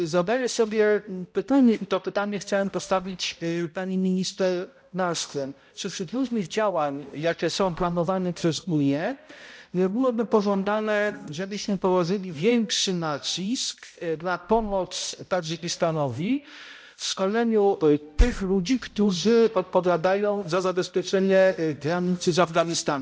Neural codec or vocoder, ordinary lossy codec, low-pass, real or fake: codec, 16 kHz, 0.5 kbps, X-Codec, HuBERT features, trained on balanced general audio; none; none; fake